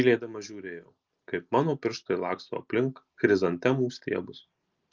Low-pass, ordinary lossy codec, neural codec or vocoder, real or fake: 7.2 kHz; Opus, 24 kbps; none; real